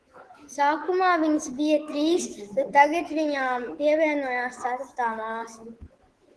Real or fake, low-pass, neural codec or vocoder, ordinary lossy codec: fake; 10.8 kHz; codec, 24 kHz, 3.1 kbps, DualCodec; Opus, 16 kbps